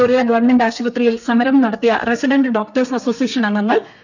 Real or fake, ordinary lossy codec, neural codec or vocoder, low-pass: fake; none; codec, 32 kHz, 1.9 kbps, SNAC; 7.2 kHz